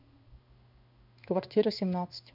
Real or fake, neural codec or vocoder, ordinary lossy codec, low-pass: fake; codec, 16 kHz in and 24 kHz out, 1 kbps, XY-Tokenizer; none; 5.4 kHz